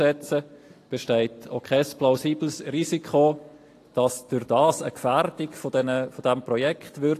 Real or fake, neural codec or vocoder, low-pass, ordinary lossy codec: real; none; 14.4 kHz; AAC, 48 kbps